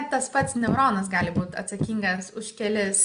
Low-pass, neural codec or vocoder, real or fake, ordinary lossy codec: 9.9 kHz; none; real; AAC, 96 kbps